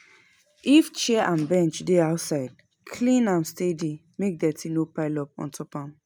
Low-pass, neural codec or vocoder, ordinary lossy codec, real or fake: none; none; none; real